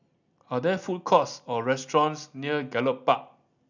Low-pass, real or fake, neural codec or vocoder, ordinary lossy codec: 7.2 kHz; real; none; none